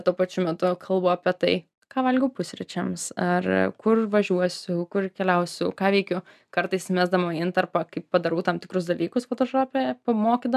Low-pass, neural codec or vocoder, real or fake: 14.4 kHz; none; real